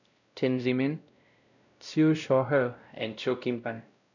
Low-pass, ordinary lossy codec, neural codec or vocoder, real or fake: 7.2 kHz; none; codec, 16 kHz, 0.5 kbps, X-Codec, WavLM features, trained on Multilingual LibriSpeech; fake